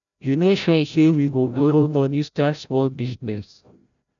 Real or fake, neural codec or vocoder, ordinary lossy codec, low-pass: fake; codec, 16 kHz, 0.5 kbps, FreqCodec, larger model; none; 7.2 kHz